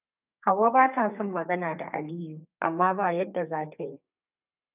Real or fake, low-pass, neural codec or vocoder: fake; 3.6 kHz; codec, 32 kHz, 1.9 kbps, SNAC